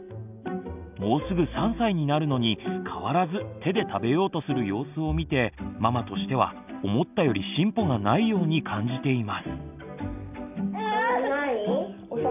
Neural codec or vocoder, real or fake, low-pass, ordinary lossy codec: none; real; 3.6 kHz; none